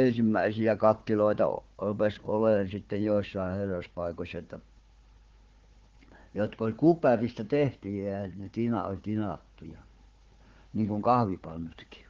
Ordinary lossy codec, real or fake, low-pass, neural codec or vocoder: Opus, 16 kbps; fake; 7.2 kHz; codec, 16 kHz, 4 kbps, FunCodec, trained on Chinese and English, 50 frames a second